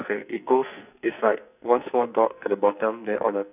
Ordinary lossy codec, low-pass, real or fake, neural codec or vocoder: none; 3.6 kHz; fake; codec, 44.1 kHz, 2.6 kbps, SNAC